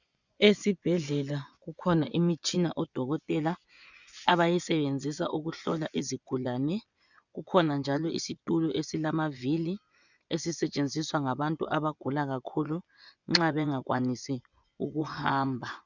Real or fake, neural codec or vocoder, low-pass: fake; vocoder, 24 kHz, 100 mel bands, Vocos; 7.2 kHz